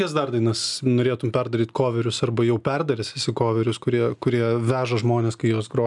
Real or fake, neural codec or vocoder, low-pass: real; none; 10.8 kHz